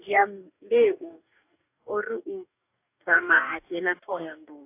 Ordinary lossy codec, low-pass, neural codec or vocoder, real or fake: AAC, 24 kbps; 3.6 kHz; codec, 44.1 kHz, 2.6 kbps, DAC; fake